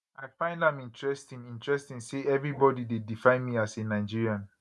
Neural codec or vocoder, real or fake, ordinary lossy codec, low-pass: none; real; none; none